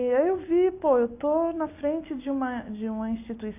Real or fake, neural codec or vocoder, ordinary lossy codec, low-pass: real; none; AAC, 24 kbps; 3.6 kHz